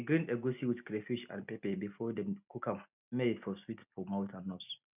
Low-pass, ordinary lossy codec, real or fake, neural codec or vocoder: 3.6 kHz; none; real; none